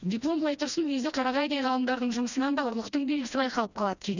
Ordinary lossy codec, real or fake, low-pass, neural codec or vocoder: none; fake; 7.2 kHz; codec, 16 kHz, 1 kbps, FreqCodec, smaller model